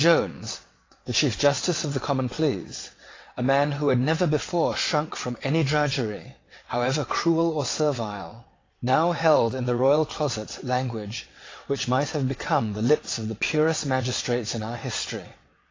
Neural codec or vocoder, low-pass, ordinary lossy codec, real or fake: vocoder, 22.05 kHz, 80 mel bands, WaveNeXt; 7.2 kHz; AAC, 32 kbps; fake